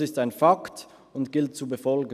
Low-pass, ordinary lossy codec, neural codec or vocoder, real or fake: 14.4 kHz; none; none; real